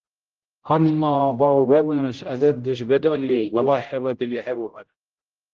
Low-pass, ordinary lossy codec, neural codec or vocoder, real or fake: 7.2 kHz; Opus, 16 kbps; codec, 16 kHz, 0.5 kbps, X-Codec, HuBERT features, trained on general audio; fake